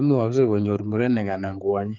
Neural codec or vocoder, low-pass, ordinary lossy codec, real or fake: codec, 16 kHz, 4 kbps, X-Codec, HuBERT features, trained on general audio; 7.2 kHz; Opus, 32 kbps; fake